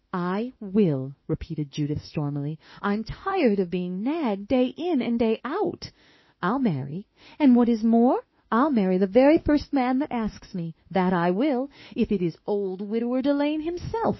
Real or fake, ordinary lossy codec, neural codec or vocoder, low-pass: fake; MP3, 24 kbps; autoencoder, 48 kHz, 32 numbers a frame, DAC-VAE, trained on Japanese speech; 7.2 kHz